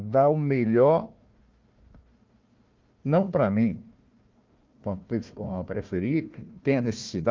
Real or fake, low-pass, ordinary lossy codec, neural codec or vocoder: fake; 7.2 kHz; Opus, 24 kbps; codec, 16 kHz, 1 kbps, FunCodec, trained on Chinese and English, 50 frames a second